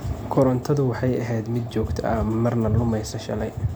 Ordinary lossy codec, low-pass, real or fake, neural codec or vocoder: none; none; real; none